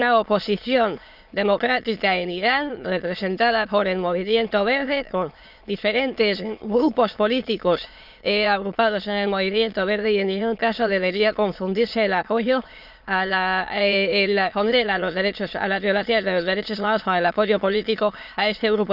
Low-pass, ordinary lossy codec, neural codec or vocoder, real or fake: 5.4 kHz; none; autoencoder, 22.05 kHz, a latent of 192 numbers a frame, VITS, trained on many speakers; fake